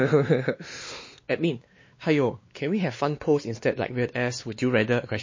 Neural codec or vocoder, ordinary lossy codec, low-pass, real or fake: codec, 16 kHz, 2 kbps, X-Codec, WavLM features, trained on Multilingual LibriSpeech; MP3, 32 kbps; 7.2 kHz; fake